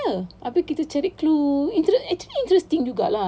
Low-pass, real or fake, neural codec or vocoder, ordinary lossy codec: none; real; none; none